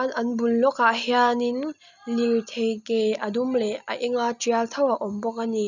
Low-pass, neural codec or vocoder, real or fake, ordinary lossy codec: 7.2 kHz; none; real; none